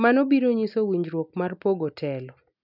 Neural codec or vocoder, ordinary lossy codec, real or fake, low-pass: none; none; real; 5.4 kHz